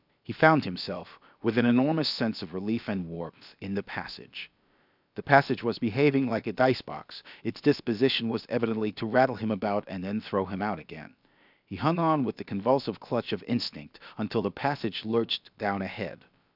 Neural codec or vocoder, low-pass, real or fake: codec, 16 kHz, about 1 kbps, DyCAST, with the encoder's durations; 5.4 kHz; fake